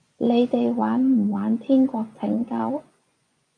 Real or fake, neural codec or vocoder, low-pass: fake; vocoder, 24 kHz, 100 mel bands, Vocos; 9.9 kHz